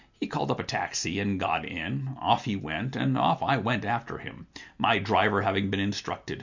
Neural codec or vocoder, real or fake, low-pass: none; real; 7.2 kHz